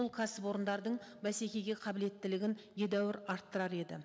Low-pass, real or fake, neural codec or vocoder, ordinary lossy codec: none; real; none; none